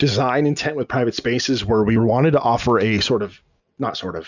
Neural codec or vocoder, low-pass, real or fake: none; 7.2 kHz; real